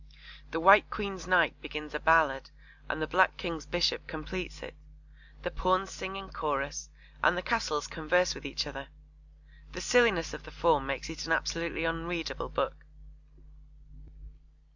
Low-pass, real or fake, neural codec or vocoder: 7.2 kHz; real; none